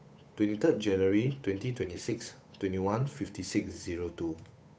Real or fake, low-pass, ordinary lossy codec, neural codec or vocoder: fake; none; none; codec, 16 kHz, 8 kbps, FunCodec, trained on Chinese and English, 25 frames a second